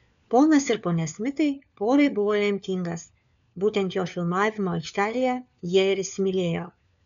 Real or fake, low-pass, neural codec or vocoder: fake; 7.2 kHz; codec, 16 kHz, 4 kbps, FunCodec, trained on LibriTTS, 50 frames a second